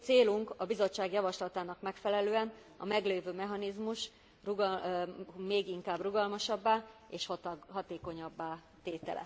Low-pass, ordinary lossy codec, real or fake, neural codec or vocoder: none; none; real; none